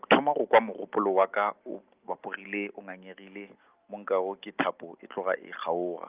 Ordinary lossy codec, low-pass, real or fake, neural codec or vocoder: Opus, 24 kbps; 3.6 kHz; real; none